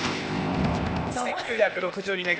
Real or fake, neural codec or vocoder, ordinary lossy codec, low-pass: fake; codec, 16 kHz, 0.8 kbps, ZipCodec; none; none